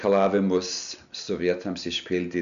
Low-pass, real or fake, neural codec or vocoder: 7.2 kHz; real; none